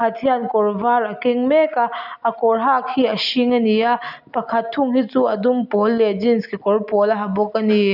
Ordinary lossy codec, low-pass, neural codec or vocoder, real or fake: none; 5.4 kHz; none; real